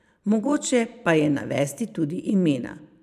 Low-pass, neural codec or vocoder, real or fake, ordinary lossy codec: 14.4 kHz; vocoder, 44.1 kHz, 128 mel bands every 512 samples, BigVGAN v2; fake; none